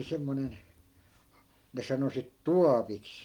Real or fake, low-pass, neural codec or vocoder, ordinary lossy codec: real; 19.8 kHz; none; Opus, 24 kbps